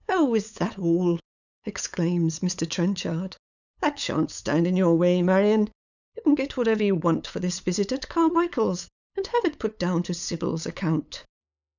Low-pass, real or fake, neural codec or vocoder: 7.2 kHz; fake; codec, 16 kHz, 8 kbps, FunCodec, trained on LibriTTS, 25 frames a second